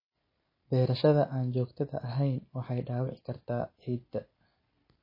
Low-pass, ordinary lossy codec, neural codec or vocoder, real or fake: 5.4 kHz; MP3, 24 kbps; none; real